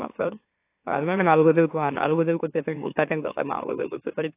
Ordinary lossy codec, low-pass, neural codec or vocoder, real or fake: AAC, 24 kbps; 3.6 kHz; autoencoder, 44.1 kHz, a latent of 192 numbers a frame, MeloTTS; fake